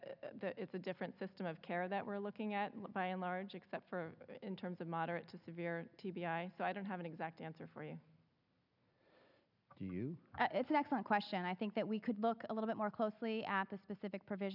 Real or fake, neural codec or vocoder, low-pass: real; none; 5.4 kHz